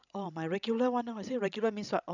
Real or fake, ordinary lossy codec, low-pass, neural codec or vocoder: fake; none; 7.2 kHz; codec, 16 kHz, 16 kbps, FreqCodec, larger model